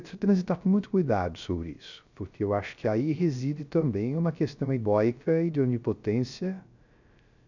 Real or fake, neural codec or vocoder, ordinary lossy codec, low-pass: fake; codec, 16 kHz, 0.3 kbps, FocalCodec; none; 7.2 kHz